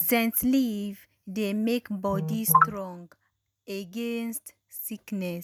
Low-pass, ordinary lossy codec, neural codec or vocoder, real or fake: none; none; none; real